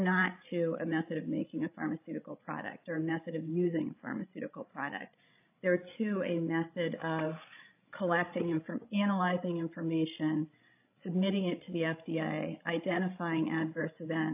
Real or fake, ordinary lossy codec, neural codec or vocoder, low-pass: fake; AAC, 32 kbps; codec, 16 kHz, 16 kbps, FreqCodec, larger model; 3.6 kHz